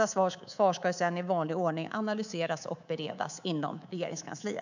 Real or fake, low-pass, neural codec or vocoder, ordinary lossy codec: fake; 7.2 kHz; codec, 24 kHz, 3.1 kbps, DualCodec; none